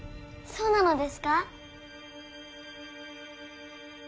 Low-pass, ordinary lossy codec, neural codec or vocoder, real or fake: none; none; none; real